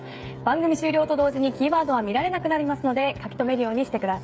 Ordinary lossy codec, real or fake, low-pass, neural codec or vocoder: none; fake; none; codec, 16 kHz, 8 kbps, FreqCodec, smaller model